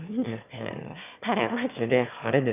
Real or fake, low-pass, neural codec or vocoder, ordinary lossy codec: fake; 3.6 kHz; autoencoder, 22.05 kHz, a latent of 192 numbers a frame, VITS, trained on one speaker; none